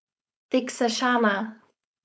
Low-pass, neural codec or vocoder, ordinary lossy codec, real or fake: none; codec, 16 kHz, 4.8 kbps, FACodec; none; fake